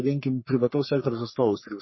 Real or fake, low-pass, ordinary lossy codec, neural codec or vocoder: fake; 7.2 kHz; MP3, 24 kbps; codec, 44.1 kHz, 3.4 kbps, Pupu-Codec